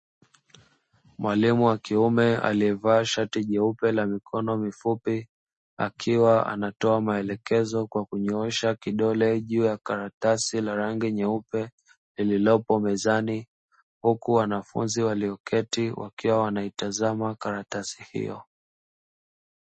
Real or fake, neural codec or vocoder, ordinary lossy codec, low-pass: real; none; MP3, 32 kbps; 10.8 kHz